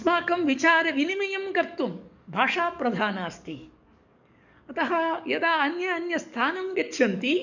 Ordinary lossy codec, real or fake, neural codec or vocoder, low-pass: none; fake; codec, 44.1 kHz, 7.8 kbps, DAC; 7.2 kHz